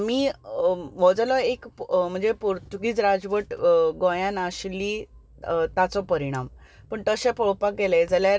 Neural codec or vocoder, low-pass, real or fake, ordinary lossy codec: none; none; real; none